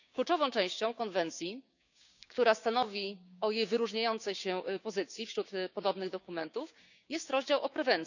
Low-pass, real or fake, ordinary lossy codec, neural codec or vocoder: 7.2 kHz; fake; none; codec, 16 kHz, 6 kbps, DAC